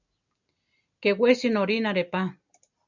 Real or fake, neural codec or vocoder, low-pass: real; none; 7.2 kHz